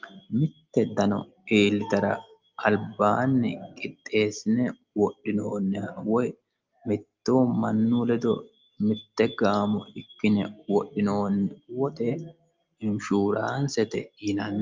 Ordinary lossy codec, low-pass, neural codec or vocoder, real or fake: Opus, 24 kbps; 7.2 kHz; none; real